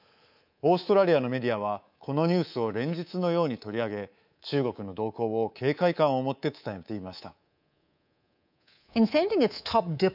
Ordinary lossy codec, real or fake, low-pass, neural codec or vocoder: none; fake; 5.4 kHz; codec, 24 kHz, 3.1 kbps, DualCodec